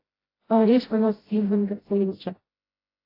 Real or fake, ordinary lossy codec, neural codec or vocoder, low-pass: fake; AAC, 24 kbps; codec, 16 kHz, 0.5 kbps, FreqCodec, smaller model; 5.4 kHz